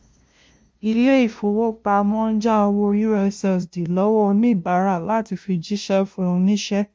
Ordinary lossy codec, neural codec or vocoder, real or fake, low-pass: none; codec, 16 kHz, 0.5 kbps, FunCodec, trained on LibriTTS, 25 frames a second; fake; none